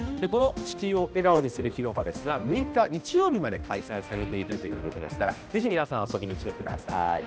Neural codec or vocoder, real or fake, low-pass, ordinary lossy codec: codec, 16 kHz, 1 kbps, X-Codec, HuBERT features, trained on balanced general audio; fake; none; none